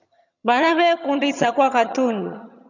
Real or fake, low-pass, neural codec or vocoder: fake; 7.2 kHz; vocoder, 22.05 kHz, 80 mel bands, HiFi-GAN